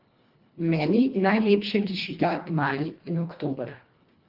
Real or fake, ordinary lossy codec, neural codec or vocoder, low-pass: fake; Opus, 32 kbps; codec, 24 kHz, 1.5 kbps, HILCodec; 5.4 kHz